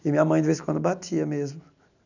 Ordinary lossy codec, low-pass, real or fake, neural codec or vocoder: none; 7.2 kHz; real; none